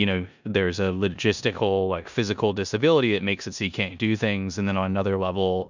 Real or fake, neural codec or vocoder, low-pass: fake; codec, 16 kHz in and 24 kHz out, 0.9 kbps, LongCat-Audio-Codec, four codebook decoder; 7.2 kHz